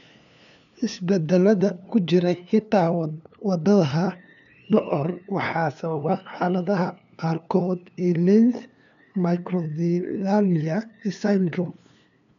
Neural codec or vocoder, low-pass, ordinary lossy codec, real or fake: codec, 16 kHz, 2 kbps, FunCodec, trained on LibriTTS, 25 frames a second; 7.2 kHz; none; fake